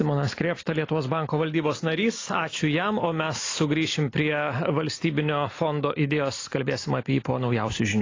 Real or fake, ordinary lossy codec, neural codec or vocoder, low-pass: real; AAC, 32 kbps; none; 7.2 kHz